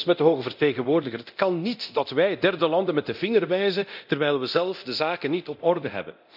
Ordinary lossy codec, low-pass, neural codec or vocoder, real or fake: none; 5.4 kHz; codec, 24 kHz, 0.9 kbps, DualCodec; fake